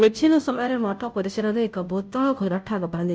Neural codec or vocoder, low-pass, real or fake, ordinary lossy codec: codec, 16 kHz, 0.5 kbps, FunCodec, trained on Chinese and English, 25 frames a second; none; fake; none